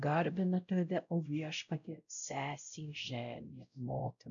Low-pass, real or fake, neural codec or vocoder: 7.2 kHz; fake; codec, 16 kHz, 0.5 kbps, X-Codec, WavLM features, trained on Multilingual LibriSpeech